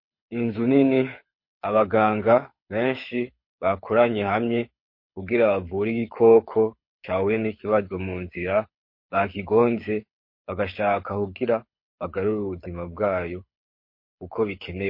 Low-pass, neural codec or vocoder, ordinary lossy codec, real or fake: 5.4 kHz; codec, 24 kHz, 6 kbps, HILCodec; MP3, 32 kbps; fake